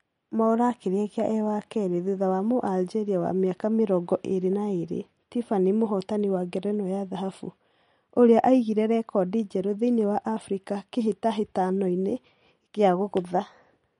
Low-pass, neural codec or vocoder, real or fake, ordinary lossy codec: 10.8 kHz; none; real; MP3, 48 kbps